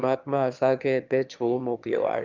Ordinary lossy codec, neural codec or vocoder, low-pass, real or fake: Opus, 24 kbps; autoencoder, 22.05 kHz, a latent of 192 numbers a frame, VITS, trained on one speaker; 7.2 kHz; fake